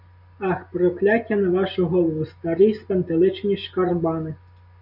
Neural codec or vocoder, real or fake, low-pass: none; real; 5.4 kHz